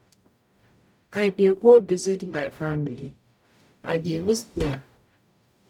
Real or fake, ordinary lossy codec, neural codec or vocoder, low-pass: fake; none; codec, 44.1 kHz, 0.9 kbps, DAC; 19.8 kHz